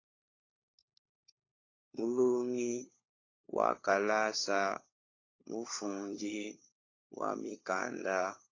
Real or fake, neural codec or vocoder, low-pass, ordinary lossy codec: fake; codec, 16 kHz, 4 kbps, FunCodec, trained on LibriTTS, 50 frames a second; 7.2 kHz; AAC, 32 kbps